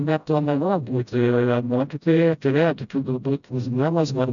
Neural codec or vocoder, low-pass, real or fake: codec, 16 kHz, 0.5 kbps, FreqCodec, smaller model; 7.2 kHz; fake